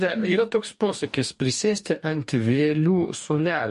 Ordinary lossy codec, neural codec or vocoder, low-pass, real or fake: MP3, 48 kbps; codec, 44.1 kHz, 2.6 kbps, DAC; 14.4 kHz; fake